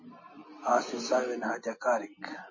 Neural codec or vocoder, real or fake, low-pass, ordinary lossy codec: none; real; 7.2 kHz; MP3, 32 kbps